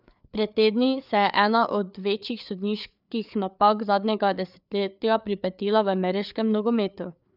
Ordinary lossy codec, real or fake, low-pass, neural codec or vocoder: none; fake; 5.4 kHz; codec, 16 kHz, 4 kbps, FreqCodec, larger model